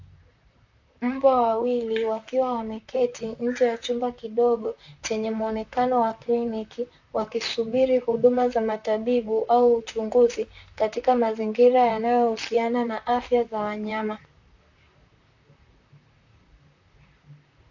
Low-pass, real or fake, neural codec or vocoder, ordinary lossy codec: 7.2 kHz; fake; vocoder, 44.1 kHz, 128 mel bands, Pupu-Vocoder; AAC, 48 kbps